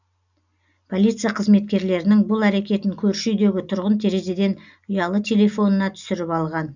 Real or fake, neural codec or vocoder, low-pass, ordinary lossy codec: real; none; 7.2 kHz; none